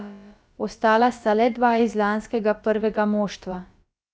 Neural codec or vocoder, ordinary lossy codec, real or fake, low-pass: codec, 16 kHz, about 1 kbps, DyCAST, with the encoder's durations; none; fake; none